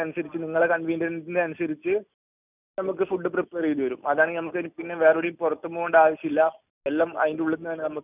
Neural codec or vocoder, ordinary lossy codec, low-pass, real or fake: none; none; 3.6 kHz; real